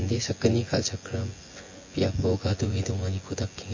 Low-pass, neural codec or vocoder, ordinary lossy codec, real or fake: 7.2 kHz; vocoder, 24 kHz, 100 mel bands, Vocos; MP3, 32 kbps; fake